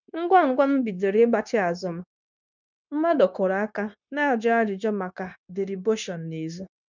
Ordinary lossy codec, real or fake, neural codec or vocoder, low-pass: none; fake; codec, 16 kHz, 0.9 kbps, LongCat-Audio-Codec; 7.2 kHz